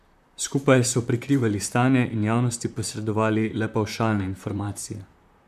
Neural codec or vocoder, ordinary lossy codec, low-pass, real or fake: vocoder, 44.1 kHz, 128 mel bands, Pupu-Vocoder; none; 14.4 kHz; fake